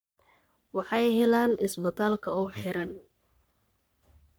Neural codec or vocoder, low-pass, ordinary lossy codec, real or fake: codec, 44.1 kHz, 3.4 kbps, Pupu-Codec; none; none; fake